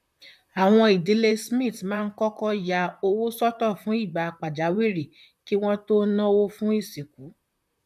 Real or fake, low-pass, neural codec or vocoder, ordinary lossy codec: fake; 14.4 kHz; vocoder, 44.1 kHz, 128 mel bands, Pupu-Vocoder; none